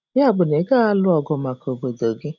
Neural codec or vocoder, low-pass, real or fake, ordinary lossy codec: none; 7.2 kHz; real; none